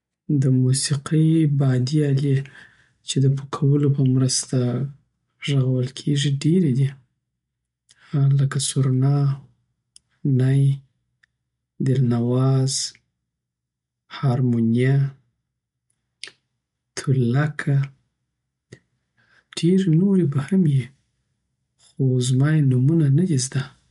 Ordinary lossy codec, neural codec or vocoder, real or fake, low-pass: MP3, 64 kbps; none; real; 10.8 kHz